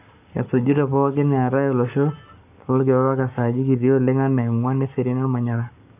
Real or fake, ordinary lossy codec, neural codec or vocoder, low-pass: fake; none; codec, 44.1 kHz, 7.8 kbps, Pupu-Codec; 3.6 kHz